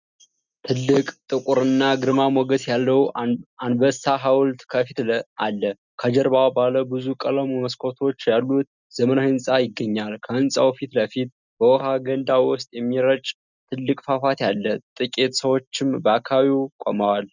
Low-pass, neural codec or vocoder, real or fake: 7.2 kHz; none; real